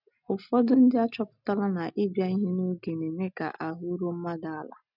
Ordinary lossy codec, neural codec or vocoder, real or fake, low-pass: none; none; real; 5.4 kHz